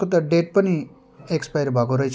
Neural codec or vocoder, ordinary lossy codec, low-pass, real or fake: none; none; none; real